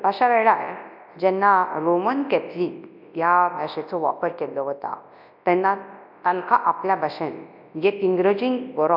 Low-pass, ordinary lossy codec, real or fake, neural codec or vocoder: 5.4 kHz; AAC, 48 kbps; fake; codec, 24 kHz, 0.9 kbps, WavTokenizer, large speech release